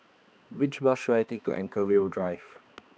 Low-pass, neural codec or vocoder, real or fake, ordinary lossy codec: none; codec, 16 kHz, 2 kbps, X-Codec, HuBERT features, trained on balanced general audio; fake; none